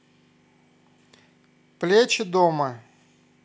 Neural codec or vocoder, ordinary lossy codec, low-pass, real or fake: none; none; none; real